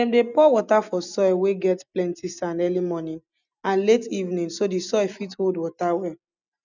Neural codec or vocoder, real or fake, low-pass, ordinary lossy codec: none; real; 7.2 kHz; none